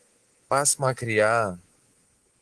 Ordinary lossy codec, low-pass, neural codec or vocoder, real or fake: Opus, 16 kbps; 10.8 kHz; codec, 24 kHz, 3.1 kbps, DualCodec; fake